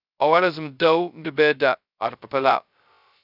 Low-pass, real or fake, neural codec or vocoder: 5.4 kHz; fake; codec, 16 kHz, 0.2 kbps, FocalCodec